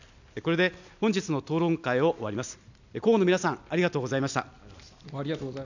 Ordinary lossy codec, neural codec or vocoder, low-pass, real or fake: none; none; 7.2 kHz; real